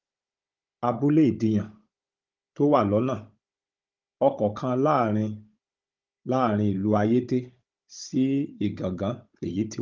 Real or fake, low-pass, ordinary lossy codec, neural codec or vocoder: fake; 7.2 kHz; Opus, 32 kbps; codec, 16 kHz, 16 kbps, FunCodec, trained on Chinese and English, 50 frames a second